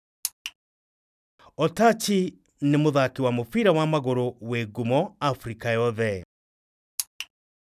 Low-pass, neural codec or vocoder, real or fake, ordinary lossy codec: 14.4 kHz; none; real; none